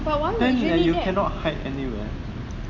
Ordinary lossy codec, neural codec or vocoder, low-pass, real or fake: none; none; 7.2 kHz; real